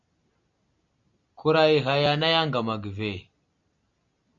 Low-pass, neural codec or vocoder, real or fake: 7.2 kHz; none; real